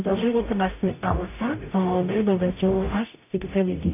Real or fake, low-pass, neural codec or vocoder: fake; 3.6 kHz; codec, 44.1 kHz, 0.9 kbps, DAC